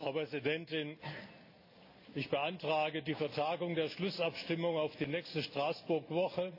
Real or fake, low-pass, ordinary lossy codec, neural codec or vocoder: real; 5.4 kHz; AAC, 32 kbps; none